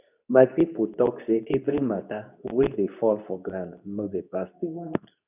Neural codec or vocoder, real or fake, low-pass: codec, 24 kHz, 0.9 kbps, WavTokenizer, medium speech release version 2; fake; 3.6 kHz